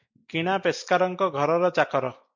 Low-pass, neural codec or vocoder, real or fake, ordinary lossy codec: 7.2 kHz; none; real; MP3, 64 kbps